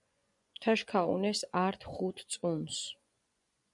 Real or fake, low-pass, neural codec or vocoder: fake; 10.8 kHz; vocoder, 24 kHz, 100 mel bands, Vocos